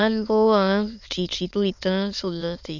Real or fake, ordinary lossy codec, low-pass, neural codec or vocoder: fake; none; 7.2 kHz; autoencoder, 22.05 kHz, a latent of 192 numbers a frame, VITS, trained on many speakers